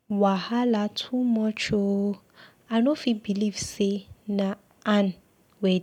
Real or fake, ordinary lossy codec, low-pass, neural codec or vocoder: real; none; 19.8 kHz; none